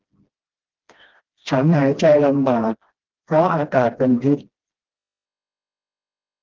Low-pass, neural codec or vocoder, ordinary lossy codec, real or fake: 7.2 kHz; codec, 16 kHz, 1 kbps, FreqCodec, smaller model; Opus, 16 kbps; fake